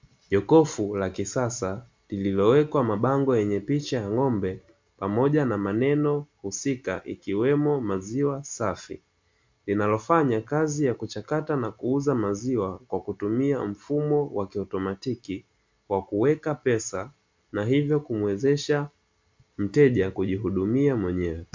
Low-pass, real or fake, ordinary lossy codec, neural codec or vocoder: 7.2 kHz; real; MP3, 64 kbps; none